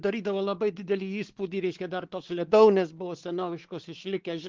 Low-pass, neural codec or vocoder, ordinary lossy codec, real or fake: 7.2 kHz; codec, 16 kHz, 4 kbps, X-Codec, WavLM features, trained on Multilingual LibriSpeech; Opus, 16 kbps; fake